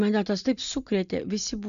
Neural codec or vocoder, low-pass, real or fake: none; 7.2 kHz; real